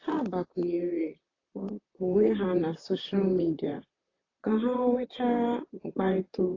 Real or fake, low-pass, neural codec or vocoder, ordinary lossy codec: fake; 7.2 kHz; vocoder, 44.1 kHz, 128 mel bands, Pupu-Vocoder; AAC, 32 kbps